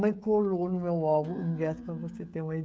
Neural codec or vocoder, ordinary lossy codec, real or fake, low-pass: codec, 16 kHz, 16 kbps, FreqCodec, smaller model; none; fake; none